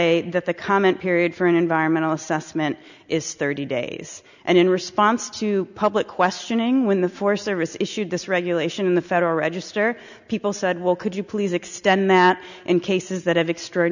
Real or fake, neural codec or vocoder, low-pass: real; none; 7.2 kHz